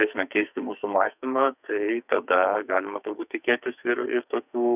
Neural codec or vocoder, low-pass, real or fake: codec, 44.1 kHz, 2.6 kbps, SNAC; 3.6 kHz; fake